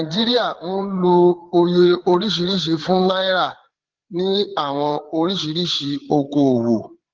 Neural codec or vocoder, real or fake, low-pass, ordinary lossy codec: codec, 16 kHz, 4 kbps, FreqCodec, larger model; fake; 7.2 kHz; Opus, 16 kbps